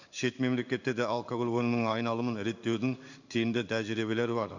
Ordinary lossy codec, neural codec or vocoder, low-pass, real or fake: none; codec, 16 kHz in and 24 kHz out, 1 kbps, XY-Tokenizer; 7.2 kHz; fake